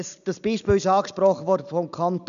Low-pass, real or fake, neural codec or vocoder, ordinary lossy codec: 7.2 kHz; real; none; none